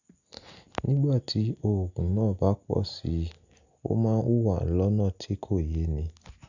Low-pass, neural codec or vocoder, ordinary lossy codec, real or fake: 7.2 kHz; none; none; real